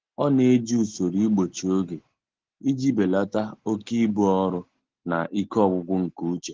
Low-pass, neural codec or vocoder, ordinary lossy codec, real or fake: 7.2 kHz; none; Opus, 16 kbps; real